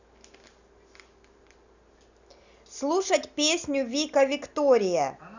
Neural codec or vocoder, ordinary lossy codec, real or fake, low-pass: none; none; real; 7.2 kHz